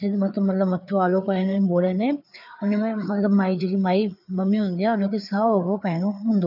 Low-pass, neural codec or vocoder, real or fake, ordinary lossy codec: 5.4 kHz; codec, 16 kHz, 4 kbps, FreqCodec, larger model; fake; none